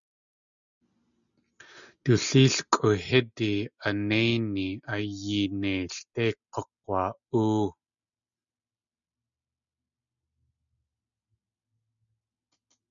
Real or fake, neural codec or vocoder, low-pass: real; none; 7.2 kHz